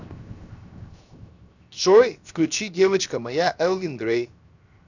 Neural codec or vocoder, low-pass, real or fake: codec, 16 kHz, 0.7 kbps, FocalCodec; 7.2 kHz; fake